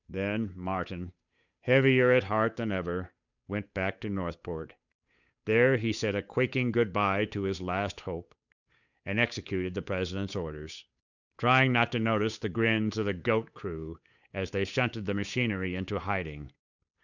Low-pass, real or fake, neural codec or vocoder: 7.2 kHz; fake; codec, 16 kHz, 8 kbps, FunCodec, trained on Chinese and English, 25 frames a second